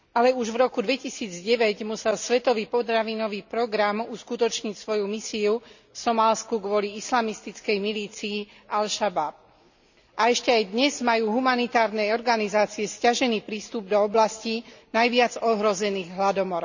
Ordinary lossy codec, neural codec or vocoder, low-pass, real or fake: none; none; 7.2 kHz; real